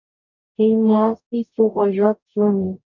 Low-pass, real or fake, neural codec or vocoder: 7.2 kHz; fake; codec, 44.1 kHz, 0.9 kbps, DAC